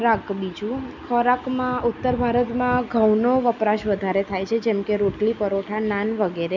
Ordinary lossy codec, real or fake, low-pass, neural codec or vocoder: none; real; 7.2 kHz; none